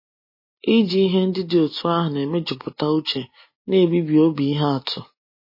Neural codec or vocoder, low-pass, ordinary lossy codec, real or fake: none; 5.4 kHz; MP3, 24 kbps; real